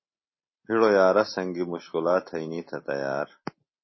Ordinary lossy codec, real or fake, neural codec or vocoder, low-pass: MP3, 24 kbps; real; none; 7.2 kHz